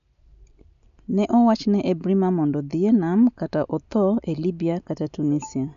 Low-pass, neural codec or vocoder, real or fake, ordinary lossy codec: 7.2 kHz; none; real; none